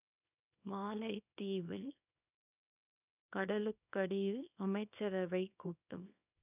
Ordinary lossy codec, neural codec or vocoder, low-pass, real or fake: none; codec, 24 kHz, 0.9 kbps, WavTokenizer, small release; 3.6 kHz; fake